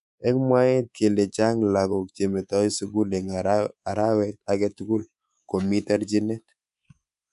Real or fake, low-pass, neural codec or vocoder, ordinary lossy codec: fake; 10.8 kHz; codec, 24 kHz, 3.1 kbps, DualCodec; none